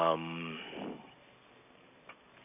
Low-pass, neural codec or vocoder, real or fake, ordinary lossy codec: 3.6 kHz; none; real; none